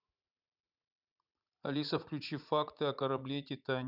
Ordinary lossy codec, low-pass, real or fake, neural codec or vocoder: none; 5.4 kHz; fake; vocoder, 44.1 kHz, 80 mel bands, Vocos